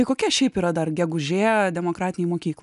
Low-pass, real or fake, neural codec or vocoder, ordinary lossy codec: 10.8 kHz; real; none; MP3, 96 kbps